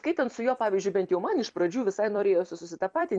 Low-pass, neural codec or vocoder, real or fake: 9.9 kHz; vocoder, 44.1 kHz, 128 mel bands every 256 samples, BigVGAN v2; fake